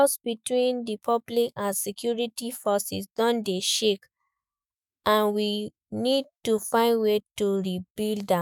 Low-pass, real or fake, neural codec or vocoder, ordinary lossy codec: none; fake; autoencoder, 48 kHz, 128 numbers a frame, DAC-VAE, trained on Japanese speech; none